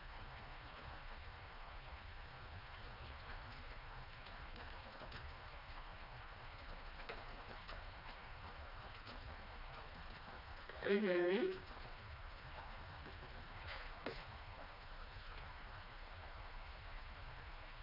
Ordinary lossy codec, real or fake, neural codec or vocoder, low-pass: none; fake; codec, 16 kHz, 2 kbps, FreqCodec, smaller model; 5.4 kHz